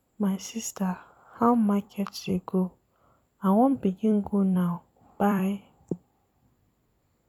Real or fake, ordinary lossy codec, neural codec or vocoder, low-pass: fake; none; vocoder, 44.1 kHz, 128 mel bands every 256 samples, BigVGAN v2; 19.8 kHz